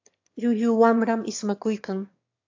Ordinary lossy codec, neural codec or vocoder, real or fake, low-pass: AAC, 48 kbps; autoencoder, 22.05 kHz, a latent of 192 numbers a frame, VITS, trained on one speaker; fake; 7.2 kHz